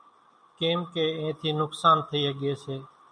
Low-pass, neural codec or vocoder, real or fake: 9.9 kHz; none; real